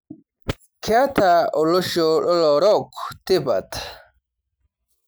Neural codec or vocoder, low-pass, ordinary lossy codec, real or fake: none; none; none; real